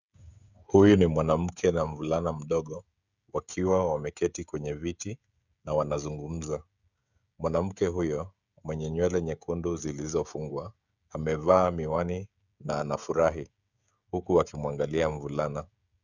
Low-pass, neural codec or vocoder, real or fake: 7.2 kHz; codec, 16 kHz, 16 kbps, FreqCodec, smaller model; fake